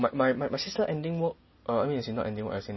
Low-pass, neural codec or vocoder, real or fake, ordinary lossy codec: 7.2 kHz; none; real; MP3, 24 kbps